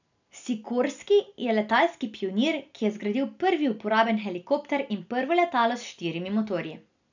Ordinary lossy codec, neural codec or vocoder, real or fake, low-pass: none; none; real; 7.2 kHz